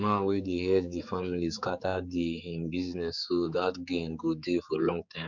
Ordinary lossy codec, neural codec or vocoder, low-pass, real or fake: none; codec, 16 kHz, 4 kbps, X-Codec, HuBERT features, trained on general audio; 7.2 kHz; fake